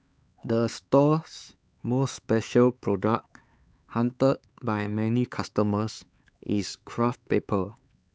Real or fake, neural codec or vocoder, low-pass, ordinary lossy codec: fake; codec, 16 kHz, 4 kbps, X-Codec, HuBERT features, trained on LibriSpeech; none; none